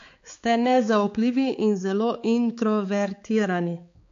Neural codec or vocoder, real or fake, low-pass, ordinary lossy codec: codec, 16 kHz, 4 kbps, X-Codec, HuBERT features, trained on balanced general audio; fake; 7.2 kHz; AAC, 48 kbps